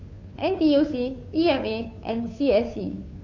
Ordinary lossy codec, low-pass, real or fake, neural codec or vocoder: AAC, 48 kbps; 7.2 kHz; fake; codec, 16 kHz, 2 kbps, FunCodec, trained on Chinese and English, 25 frames a second